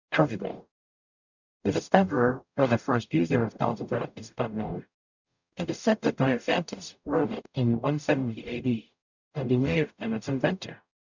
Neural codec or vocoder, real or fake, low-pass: codec, 44.1 kHz, 0.9 kbps, DAC; fake; 7.2 kHz